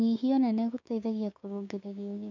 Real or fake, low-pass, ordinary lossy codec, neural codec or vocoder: fake; 7.2 kHz; none; codec, 16 kHz, 6 kbps, DAC